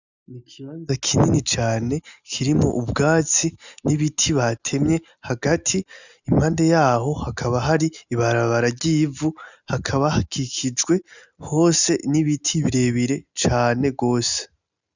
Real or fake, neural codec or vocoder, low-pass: real; none; 7.2 kHz